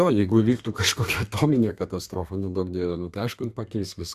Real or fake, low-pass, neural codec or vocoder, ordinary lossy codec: fake; 14.4 kHz; codec, 32 kHz, 1.9 kbps, SNAC; Opus, 64 kbps